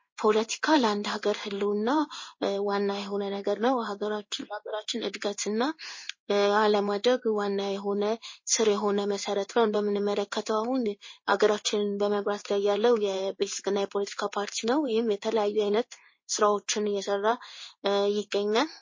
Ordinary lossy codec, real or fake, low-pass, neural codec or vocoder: MP3, 32 kbps; fake; 7.2 kHz; codec, 16 kHz in and 24 kHz out, 1 kbps, XY-Tokenizer